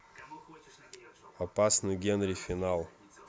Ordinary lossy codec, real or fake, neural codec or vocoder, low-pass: none; real; none; none